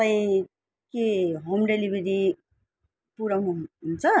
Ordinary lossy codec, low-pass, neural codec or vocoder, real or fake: none; none; none; real